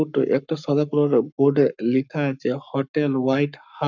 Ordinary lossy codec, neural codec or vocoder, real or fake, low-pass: none; codec, 44.1 kHz, 7.8 kbps, Pupu-Codec; fake; 7.2 kHz